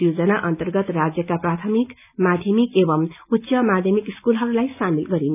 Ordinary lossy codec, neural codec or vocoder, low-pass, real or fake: none; none; 3.6 kHz; real